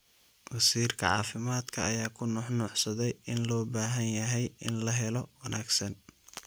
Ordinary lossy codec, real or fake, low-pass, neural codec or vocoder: none; real; none; none